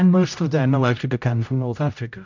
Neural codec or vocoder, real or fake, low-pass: codec, 16 kHz, 0.5 kbps, X-Codec, HuBERT features, trained on general audio; fake; 7.2 kHz